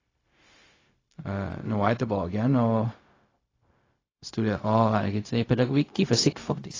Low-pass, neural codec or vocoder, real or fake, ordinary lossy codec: 7.2 kHz; codec, 16 kHz, 0.4 kbps, LongCat-Audio-Codec; fake; AAC, 32 kbps